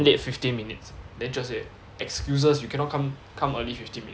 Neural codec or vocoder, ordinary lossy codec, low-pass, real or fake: none; none; none; real